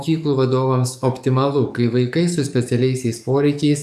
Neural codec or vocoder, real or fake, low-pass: codec, 44.1 kHz, 7.8 kbps, DAC; fake; 14.4 kHz